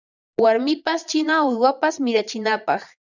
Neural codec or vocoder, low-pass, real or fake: vocoder, 22.05 kHz, 80 mel bands, Vocos; 7.2 kHz; fake